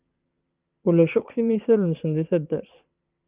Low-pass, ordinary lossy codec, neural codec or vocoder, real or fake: 3.6 kHz; Opus, 32 kbps; vocoder, 22.05 kHz, 80 mel bands, Vocos; fake